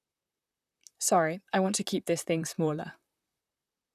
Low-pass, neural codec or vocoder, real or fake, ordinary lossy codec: 14.4 kHz; vocoder, 44.1 kHz, 128 mel bands, Pupu-Vocoder; fake; none